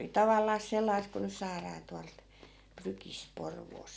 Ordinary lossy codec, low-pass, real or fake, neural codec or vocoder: none; none; real; none